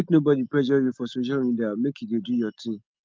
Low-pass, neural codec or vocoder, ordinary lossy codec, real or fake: 7.2 kHz; none; Opus, 32 kbps; real